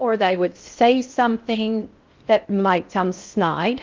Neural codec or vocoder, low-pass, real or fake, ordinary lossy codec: codec, 16 kHz in and 24 kHz out, 0.6 kbps, FocalCodec, streaming, 4096 codes; 7.2 kHz; fake; Opus, 24 kbps